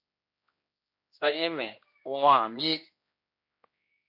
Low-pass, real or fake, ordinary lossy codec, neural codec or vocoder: 5.4 kHz; fake; MP3, 32 kbps; codec, 16 kHz, 1 kbps, X-Codec, HuBERT features, trained on general audio